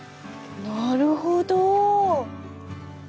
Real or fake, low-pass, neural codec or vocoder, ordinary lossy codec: real; none; none; none